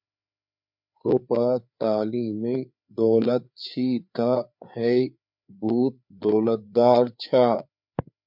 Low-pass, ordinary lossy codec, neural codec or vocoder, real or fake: 5.4 kHz; MP3, 48 kbps; codec, 16 kHz, 4 kbps, FreqCodec, larger model; fake